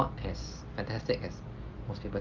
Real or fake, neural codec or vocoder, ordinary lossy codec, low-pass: real; none; Opus, 24 kbps; 7.2 kHz